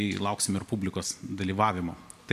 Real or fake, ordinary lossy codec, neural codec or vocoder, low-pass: real; MP3, 96 kbps; none; 14.4 kHz